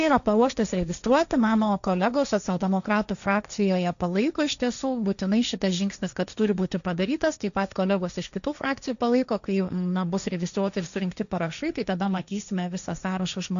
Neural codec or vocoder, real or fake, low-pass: codec, 16 kHz, 1.1 kbps, Voila-Tokenizer; fake; 7.2 kHz